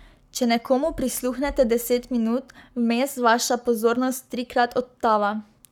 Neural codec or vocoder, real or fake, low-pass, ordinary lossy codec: codec, 44.1 kHz, 7.8 kbps, Pupu-Codec; fake; 19.8 kHz; none